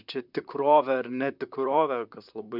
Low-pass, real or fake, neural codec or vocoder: 5.4 kHz; fake; vocoder, 44.1 kHz, 128 mel bands, Pupu-Vocoder